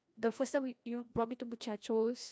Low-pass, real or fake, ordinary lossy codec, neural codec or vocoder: none; fake; none; codec, 16 kHz, 1 kbps, FreqCodec, larger model